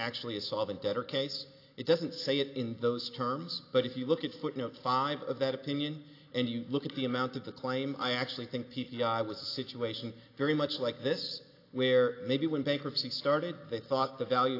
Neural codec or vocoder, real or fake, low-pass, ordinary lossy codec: none; real; 5.4 kHz; AAC, 32 kbps